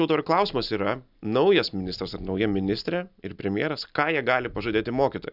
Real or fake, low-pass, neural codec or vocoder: real; 5.4 kHz; none